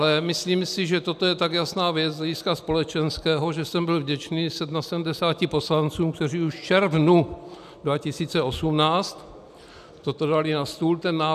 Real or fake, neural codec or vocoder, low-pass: real; none; 14.4 kHz